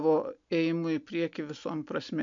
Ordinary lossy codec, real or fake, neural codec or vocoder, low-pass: MP3, 64 kbps; real; none; 7.2 kHz